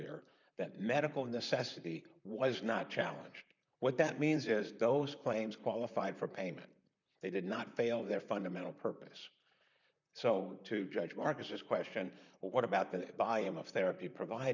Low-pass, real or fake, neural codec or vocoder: 7.2 kHz; fake; vocoder, 44.1 kHz, 128 mel bands, Pupu-Vocoder